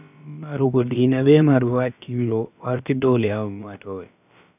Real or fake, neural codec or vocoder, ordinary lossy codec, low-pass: fake; codec, 16 kHz, about 1 kbps, DyCAST, with the encoder's durations; none; 3.6 kHz